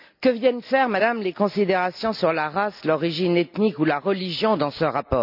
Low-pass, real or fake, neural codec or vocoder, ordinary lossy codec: 5.4 kHz; real; none; none